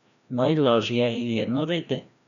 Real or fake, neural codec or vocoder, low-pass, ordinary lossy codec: fake; codec, 16 kHz, 1 kbps, FreqCodec, larger model; 7.2 kHz; none